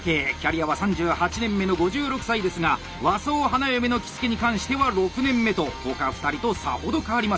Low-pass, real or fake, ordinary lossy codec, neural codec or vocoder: none; real; none; none